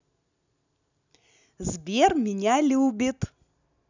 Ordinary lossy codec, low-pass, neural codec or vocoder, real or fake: none; 7.2 kHz; none; real